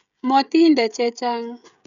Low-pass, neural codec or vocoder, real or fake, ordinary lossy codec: 7.2 kHz; codec, 16 kHz, 16 kbps, FreqCodec, smaller model; fake; none